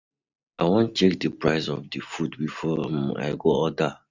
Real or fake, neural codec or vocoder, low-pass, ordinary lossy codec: real; none; 7.2 kHz; Opus, 64 kbps